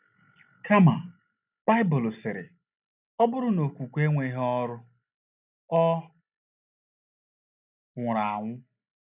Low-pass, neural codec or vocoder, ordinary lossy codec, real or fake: 3.6 kHz; none; none; real